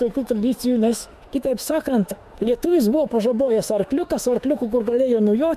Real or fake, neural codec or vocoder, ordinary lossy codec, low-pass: fake; autoencoder, 48 kHz, 32 numbers a frame, DAC-VAE, trained on Japanese speech; MP3, 96 kbps; 14.4 kHz